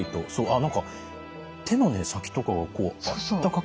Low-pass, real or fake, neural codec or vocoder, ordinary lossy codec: none; real; none; none